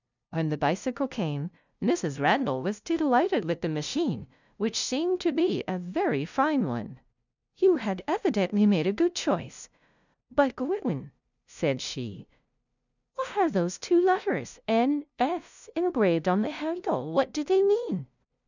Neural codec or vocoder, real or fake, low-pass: codec, 16 kHz, 0.5 kbps, FunCodec, trained on LibriTTS, 25 frames a second; fake; 7.2 kHz